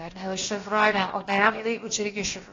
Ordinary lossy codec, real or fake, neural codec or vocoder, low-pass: AAC, 32 kbps; fake; codec, 16 kHz, about 1 kbps, DyCAST, with the encoder's durations; 7.2 kHz